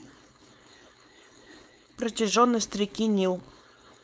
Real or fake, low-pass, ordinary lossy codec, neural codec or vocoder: fake; none; none; codec, 16 kHz, 4.8 kbps, FACodec